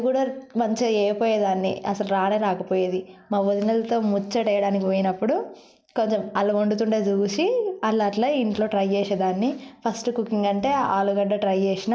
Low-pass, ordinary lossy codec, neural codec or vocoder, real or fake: none; none; none; real